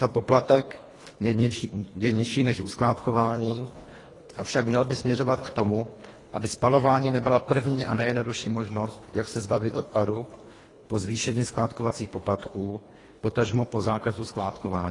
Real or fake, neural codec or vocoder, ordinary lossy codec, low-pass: fake; codec, 24 kHz, 1.5 kbps, HILCodec; AAC, 32 kbps; 10.8 kHz